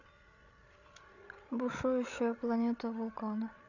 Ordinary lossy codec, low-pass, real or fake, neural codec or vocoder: none; 7.2 kHz; fake; codec, 16 kHz, 16 kbps, FreqCodec, larger model